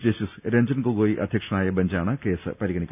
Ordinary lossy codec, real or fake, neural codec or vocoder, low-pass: none; real; none; 3.6 kHz